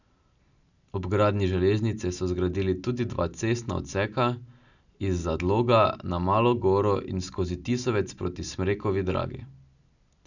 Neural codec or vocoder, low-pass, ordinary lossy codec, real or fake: none; 7.2 kHz; none; real